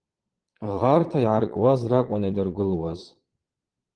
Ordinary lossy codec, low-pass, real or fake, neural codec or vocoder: Opus, 16 kbps; 9.9 kHz; fake; vocoder, 22.05 kHz, 80 mel bands, Vocos